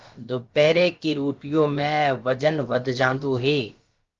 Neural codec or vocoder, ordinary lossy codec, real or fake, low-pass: codec, 16 kHz, about 1 kbps, DyCAST, with the encoder's durations; Opus, 32 kbps; fake; 7.2 kHz